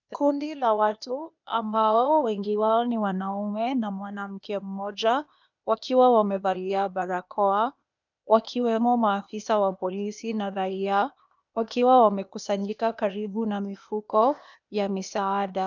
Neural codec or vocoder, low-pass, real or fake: codec, 16 kHz, 0.8 kbps, ZipCodec; 7.2 kHz; fake